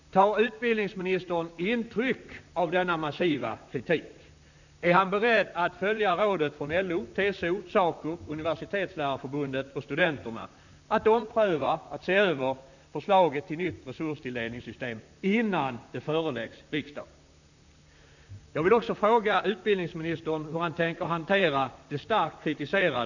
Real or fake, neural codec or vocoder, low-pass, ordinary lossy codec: fake; vocoder, 44.1 kHz, 128 mel bands, Pupu-Vocoder; 7.2 kHz; none